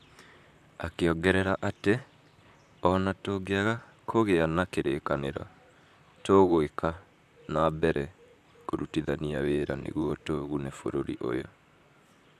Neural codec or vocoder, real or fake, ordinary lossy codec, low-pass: vocoder, 44.1 kHz, 128 mel bands, Pupu-Vocoder; fake; none; 14.4 kHz